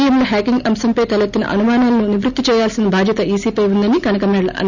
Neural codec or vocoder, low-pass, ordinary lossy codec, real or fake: none; 7.2 kHz; none; real